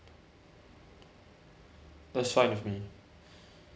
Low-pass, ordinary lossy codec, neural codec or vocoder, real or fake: none; none; none; real